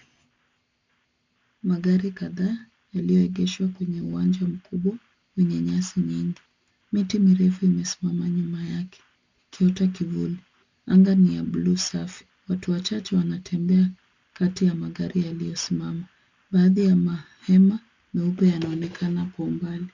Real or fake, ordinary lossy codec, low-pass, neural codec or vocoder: real; MP3, 64 kbps; 7.2 kHz; none